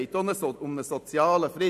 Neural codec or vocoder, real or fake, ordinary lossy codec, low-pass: none; real; none; 14.4 kHz